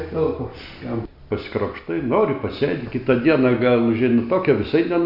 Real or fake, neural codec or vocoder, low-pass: real; none; 5.4 kHz